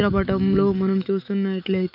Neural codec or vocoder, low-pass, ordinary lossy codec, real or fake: autoencoder, 48 kHz, 128 numbers a frame, DAC-VAE, trained on Japanese speech; 5.4 kHz; none; fake